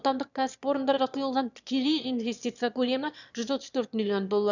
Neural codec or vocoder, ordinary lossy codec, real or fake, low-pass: autoencoder, 22.05 kHz, a latent of 192 numbers a frame, VITS, trained on one speaker; none; fake; 7.2 kHz